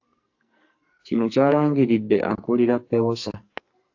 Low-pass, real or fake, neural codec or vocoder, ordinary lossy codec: 7.2 kHz; fake; codec, 44.1 kHz, 2.6 kbps, SNAC; AAC, 48 kbps